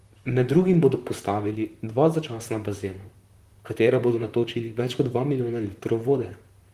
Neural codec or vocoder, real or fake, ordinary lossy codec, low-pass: vocoder, 44.1 kHz, 128 mel bands, Pupu-Vocoder; fake; Opus, 24 kbps; 14.4 kHz